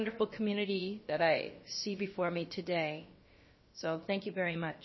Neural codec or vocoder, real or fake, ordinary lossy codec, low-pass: codec, 16 kHz, about 1 kbps, DyCAST, with the encoder's durations; fake; MP3, 24 kbps; 7.2 kHz